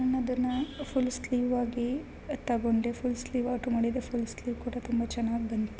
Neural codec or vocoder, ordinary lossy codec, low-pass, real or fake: none; none; none; real